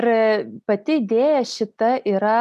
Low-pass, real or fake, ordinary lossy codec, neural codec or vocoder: 14.4 kHz; real; MP3, 96 kbps; none